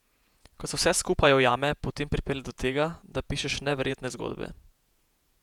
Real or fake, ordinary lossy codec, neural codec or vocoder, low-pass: fake; none; vocoder, 48 kHz, 128 mel bands, Vocos; 19.8 kHz